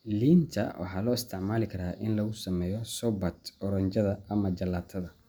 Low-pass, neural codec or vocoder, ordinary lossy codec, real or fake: none; none; none; real